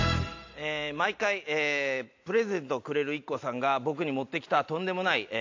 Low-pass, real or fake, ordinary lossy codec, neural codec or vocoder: 7.2 kHz; real; AAC, 48 kbps; none